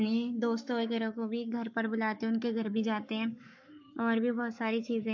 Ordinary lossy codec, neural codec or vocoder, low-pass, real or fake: MP3, 48 kbps; codec, 44.1 kHz, 7.8 kbps, Pupu-Codec; 7.2 kHz; fake